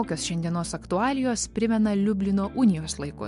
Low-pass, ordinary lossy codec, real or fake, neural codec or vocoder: 10.8 kHz; MP3, 64 kbps; real; none